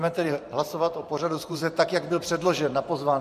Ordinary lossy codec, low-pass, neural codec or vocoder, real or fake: MP3, 64 kbps; 14.4 kHz; vocoder, 44.1 kHz, 128 mel bands every 512 samples, BigVGAN v2; fake